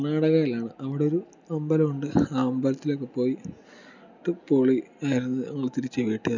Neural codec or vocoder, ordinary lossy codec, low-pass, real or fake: none; none; 7.2 kHz; real